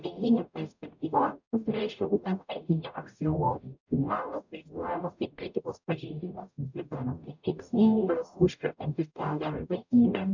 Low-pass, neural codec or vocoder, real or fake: 7.2 kHz; codec, 44.1 kHz, 0.9 kbps, DAC; fake